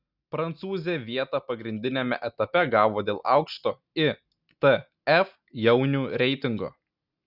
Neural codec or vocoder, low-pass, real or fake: none; 5.4 kHz; real